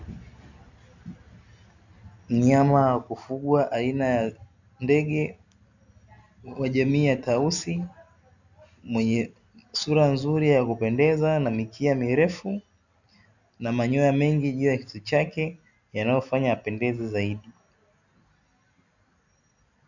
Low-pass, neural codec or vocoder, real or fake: 7.2 kHz; none; real